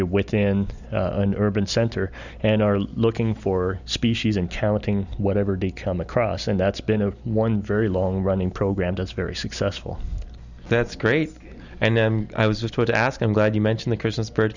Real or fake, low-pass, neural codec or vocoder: real; 7.2 kHz; none